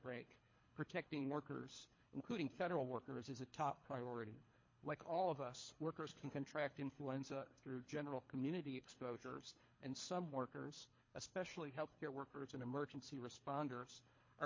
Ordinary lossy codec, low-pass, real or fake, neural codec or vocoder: MP3, 32 kbps; 7.2 kHz; fake; codec, 24 kHz, 3 kbps, HILCodec